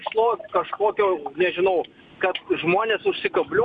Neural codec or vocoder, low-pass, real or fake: none; 9.9 kHz; real